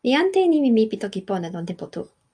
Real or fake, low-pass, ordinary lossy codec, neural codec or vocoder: real; 9.9 kHz; AAC, 64 kbps; none